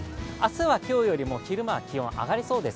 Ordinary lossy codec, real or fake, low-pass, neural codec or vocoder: none; real; none; none